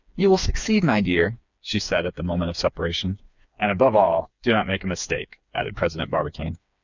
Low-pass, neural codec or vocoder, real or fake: 7.2 kHz; codec, 16 kHz, 4 kbps, FreqCodec, smaller model; fake